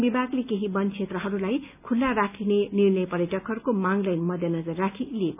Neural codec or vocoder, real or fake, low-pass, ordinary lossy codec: none; real; 3.6 kHz; none